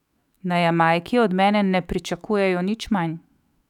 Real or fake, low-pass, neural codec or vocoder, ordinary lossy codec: fake; 19.8 kHz; autoencoder, 48 kHz, 128 numbers a frame, DAC-VAE, trained on Japanese speech; none